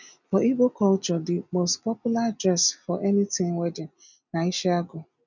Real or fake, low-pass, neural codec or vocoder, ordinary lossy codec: real; 7.2 kHz; none; none